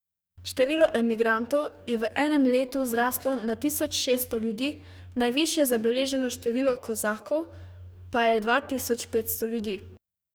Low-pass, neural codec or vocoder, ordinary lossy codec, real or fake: none; codec, 44.1 kHz, 2.6 kbps, DAC; none; fake